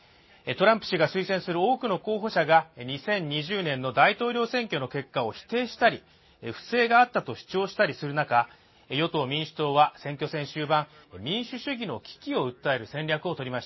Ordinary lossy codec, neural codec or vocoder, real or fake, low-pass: MP3, 24 kbps; none; real; 7.2 kHz